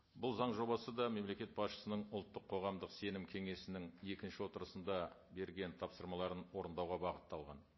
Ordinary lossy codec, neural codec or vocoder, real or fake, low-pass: MP3, 24 kbps; none; real; 7.2 kHz